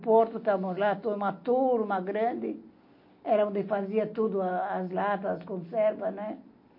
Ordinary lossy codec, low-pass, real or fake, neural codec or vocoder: MP3, 32 kbps; 5.4 kHz; real; none